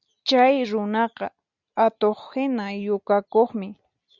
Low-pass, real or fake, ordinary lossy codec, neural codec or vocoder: 7.2 kHz; real; Opus, 64 kbps; none